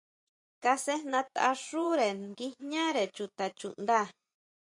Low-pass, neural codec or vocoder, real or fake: 10.8 kHz; vocoder, 24 kHz, 100 mel bands, Vocos; fake